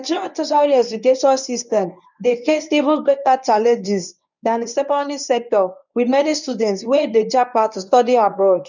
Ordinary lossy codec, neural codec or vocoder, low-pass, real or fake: none; codec, 24 kHz, 0.9 kbps, WavTokenizer, medium speech release version 1; 7.2 kHz; fake